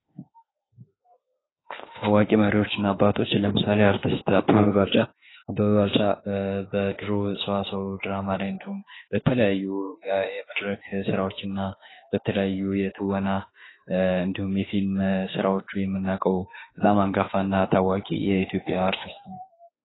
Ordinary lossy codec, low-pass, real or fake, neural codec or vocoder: AAC, 16 kbps; 7.2 kHz; fake; autoencoder, 48 kHz, 32 numbers a frame, DAC-VAE, trained on Japanese speech